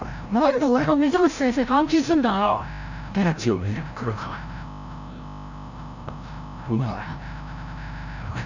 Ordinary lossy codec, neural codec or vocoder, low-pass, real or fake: none; codec, 16 kHz, 0.5 kbps, FreqCodec, larger model; 7.2 kHz; fake